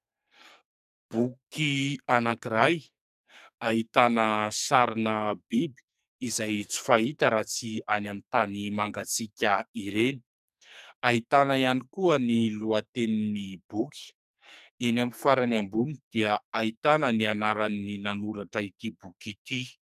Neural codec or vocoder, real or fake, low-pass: codec, 44.1 kHz, 2.6 kbps, SNAC; fake; 14.4 kHz